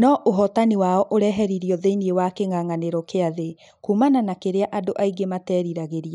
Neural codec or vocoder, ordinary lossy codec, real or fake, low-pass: none; none; real; 10.8 kHz